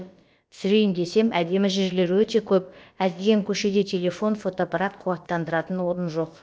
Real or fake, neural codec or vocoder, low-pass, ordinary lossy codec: fake; codec, 16 kHz, about 1 kbps, DyCAST, with the encoder's durations; none; none